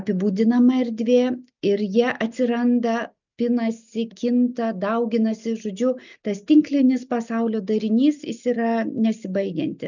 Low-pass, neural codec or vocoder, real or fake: 7.2 kHz; none; real